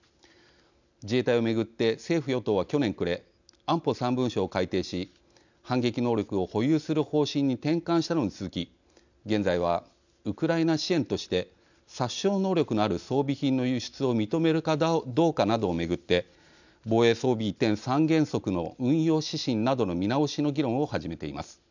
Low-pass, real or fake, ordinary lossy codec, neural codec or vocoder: 7.2 kHz; real; none; none